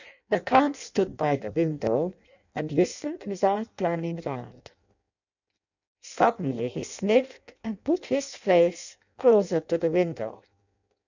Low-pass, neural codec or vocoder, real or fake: 7.2 kHz; codec, 16 kHz in and 24 kHz out, 0.6 kbps, FireRedTTS-2 codec; fake